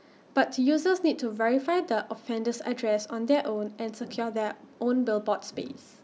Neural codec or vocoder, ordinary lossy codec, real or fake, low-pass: none; none; real; none